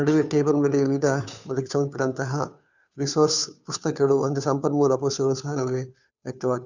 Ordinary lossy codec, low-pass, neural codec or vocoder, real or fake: none; 7.2 kHz; codec, 16 kHz, 2 kbps, FunCodec, trained on Chinese and English, 25 frames a second; fake